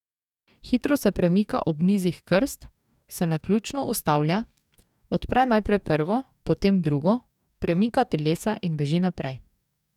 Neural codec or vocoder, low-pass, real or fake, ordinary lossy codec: codec, 44.1 kHz, 2.6 kbps, DAC; 19.8 kHz; fake; none